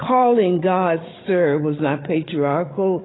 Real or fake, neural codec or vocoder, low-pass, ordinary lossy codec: fake; codec, 16 kHz, 16 kbps, FreqCodec, larger model; 7.2 kHz; AAC, 16 kbps